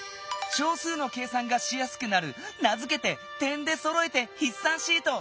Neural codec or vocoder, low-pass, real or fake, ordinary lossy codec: none; none; real; none